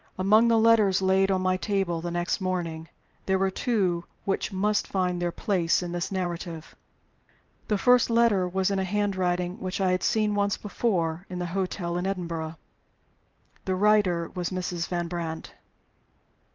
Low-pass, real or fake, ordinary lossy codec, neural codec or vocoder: 7.2 kHz; fake; Opus, 24 kbps; vocoder, 44.1 kHz, 128 mel bands every 512 samples, BigVGAN v2